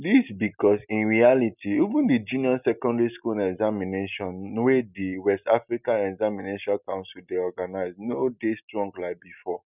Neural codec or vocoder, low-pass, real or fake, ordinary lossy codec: none; 3.6 kHz; real; none